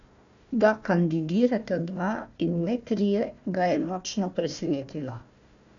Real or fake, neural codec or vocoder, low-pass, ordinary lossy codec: fake; codec, 16 kHz, 1 kbps, FunCodec, trained on Chinese and English, 50 frames a second; 7.2 kHz; none